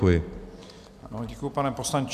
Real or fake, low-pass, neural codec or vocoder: real; 14.4 kHz; none